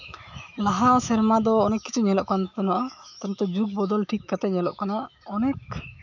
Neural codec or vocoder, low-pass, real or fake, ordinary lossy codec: autoencoder, 48 kHz, 128 numbers a frame, DAC-VAE, trained on Japanese speech; 7.2 kHz; fake; none